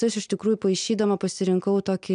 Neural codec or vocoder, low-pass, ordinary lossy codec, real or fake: none; 9.9 kHz; MP3, 96 kbps; real